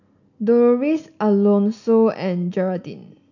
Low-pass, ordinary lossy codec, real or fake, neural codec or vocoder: 7.2 kHz; none; real; none